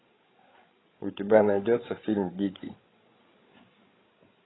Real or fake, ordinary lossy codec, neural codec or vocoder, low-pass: fake; AAC, 16 kbps; codec, 16 kHz, 16 kbps, FreqCodec, larger model; 7.2 kHz